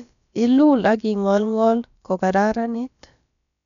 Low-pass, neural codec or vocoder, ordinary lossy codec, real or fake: 7.2 kHz; codec, 16 kHz, about 1 kbps, DyCAST, with the encoder's durations; none; fake